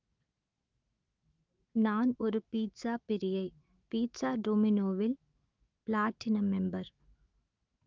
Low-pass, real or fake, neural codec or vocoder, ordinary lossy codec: 7.2 kHz; fake; autoencoder, 48 kHz, 128 numbers a frame, DAC-VAE, trained on Japanese speech; Opus, 32 kbps